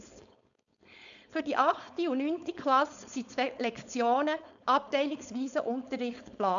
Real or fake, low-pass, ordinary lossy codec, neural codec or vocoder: fake; 7.2 kHz; none; codec, 16 kHz, 4.8 kbps, FACodec